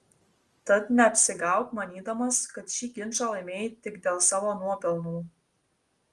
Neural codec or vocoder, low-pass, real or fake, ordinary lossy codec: none; 10.8 kHz; real; Opus, 24 kbps